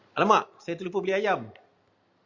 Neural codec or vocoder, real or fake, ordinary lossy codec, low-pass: none; real; Opus, 64 kbps; 7.2 kHz